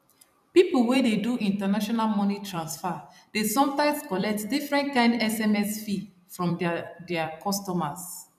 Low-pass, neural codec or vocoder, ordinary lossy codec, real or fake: 14.4 kHz; vocoder, 44.1 kHz, 128 mel bands every 256 samples, BigVGAN v2; none; fake